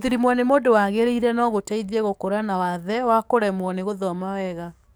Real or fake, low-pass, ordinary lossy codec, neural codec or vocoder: fake; none; none; codec, 44.1 kHz, 7.8 kbps, DAC